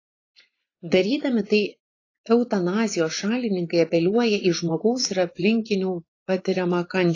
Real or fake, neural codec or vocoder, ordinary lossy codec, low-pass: fake; vocoder, 24 kHz, 100 mel bands, Vocos; AAC, 32 kbps; 7.2 kHz